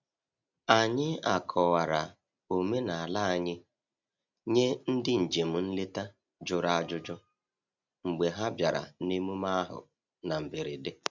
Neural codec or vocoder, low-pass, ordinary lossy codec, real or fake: none; 7.2 kHz; none; real